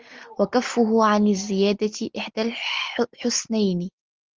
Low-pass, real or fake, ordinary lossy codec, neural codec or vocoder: 7.2 kHz; real; Opus, 32 kbps; none